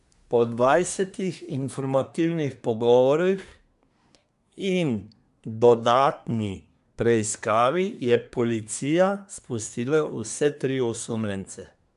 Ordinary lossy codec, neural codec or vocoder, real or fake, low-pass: AAC, 96 kbps; codec, 24 kHz, 1 kbps, SNAC; fake; 10.8 kHz